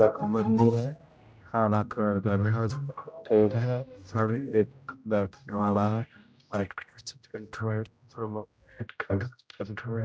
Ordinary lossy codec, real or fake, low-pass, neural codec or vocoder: none; fake; none; codec, 16 kHz, 0.5 kbps, X-Codec, HuBERT features, trained on general audio